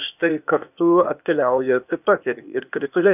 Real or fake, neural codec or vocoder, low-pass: fake; codec, 16 kHz, 0.8 kbps, ZipCodec; 3.6 kHz